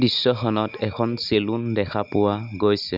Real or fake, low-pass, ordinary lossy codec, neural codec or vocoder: real; 5.4 kHz; none; none